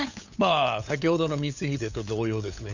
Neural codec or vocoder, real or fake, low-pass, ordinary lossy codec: codec, 16 kHz, 8 kbps, FunCodec, trained on LibriTTS, 25 frames a second; fake; 7.2 kHz; none